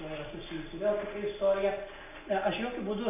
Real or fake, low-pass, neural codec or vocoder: real; 3.6 kHz; none